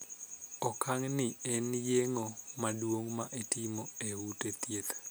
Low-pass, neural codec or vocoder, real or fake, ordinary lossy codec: none; none; real; none